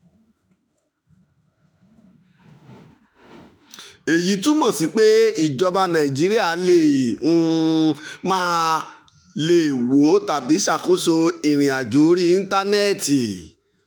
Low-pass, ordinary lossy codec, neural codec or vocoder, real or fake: none; none; autoencoder, 48 kHz, 32 numbers a frame, DAC-VAE, trained on Japanese speech; fake